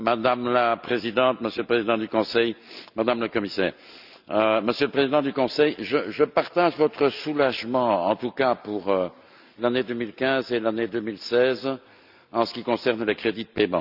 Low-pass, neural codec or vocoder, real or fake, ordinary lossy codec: 5.4 kHz; none; real; none